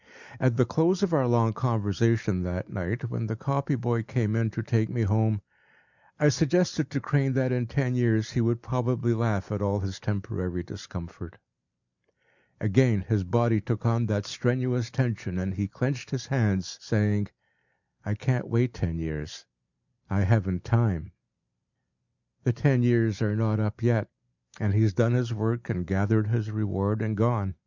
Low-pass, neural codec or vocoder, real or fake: 7.2 kHz; none; real